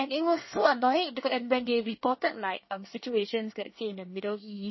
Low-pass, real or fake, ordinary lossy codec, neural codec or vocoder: 7.2 kHz; fake; MP3, 24 kbps; codec, 24 kHz, 1 kbps, SNAC